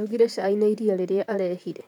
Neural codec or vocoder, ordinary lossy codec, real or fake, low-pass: vocoder, 44.1 kHz, 128 mel bands, Pupu-Vocoder; none; fake; 19.8 kHz